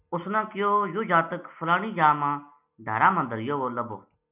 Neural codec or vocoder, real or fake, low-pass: none; real; 3.6 kHz